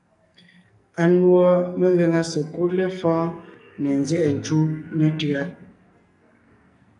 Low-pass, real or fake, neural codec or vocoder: 10.8 kHz; fake; codec, 44.1 kHz, 2.6 kbps, SNAC